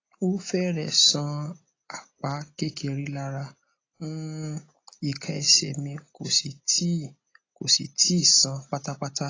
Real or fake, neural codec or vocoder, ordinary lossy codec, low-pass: real; none; AAC, 32 kbps; 7.2 kHz